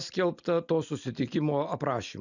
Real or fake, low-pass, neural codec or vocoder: real; 7.2 kHz; none